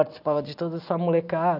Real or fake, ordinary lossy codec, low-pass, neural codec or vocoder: real; none; 5.4 kHz; none